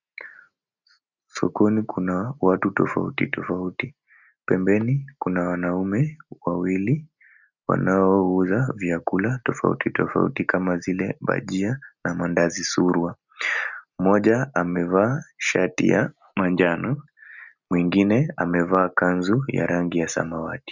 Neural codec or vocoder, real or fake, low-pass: none; real; 7.2 kHz